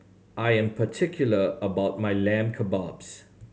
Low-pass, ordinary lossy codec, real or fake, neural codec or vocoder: none; none; real; none